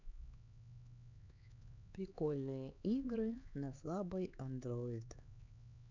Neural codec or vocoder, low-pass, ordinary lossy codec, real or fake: codec, 16 kHz, 4 kbps, X-Codec, HuBERT features, trained on LibriSpeech; 7.2 kHz; none; fake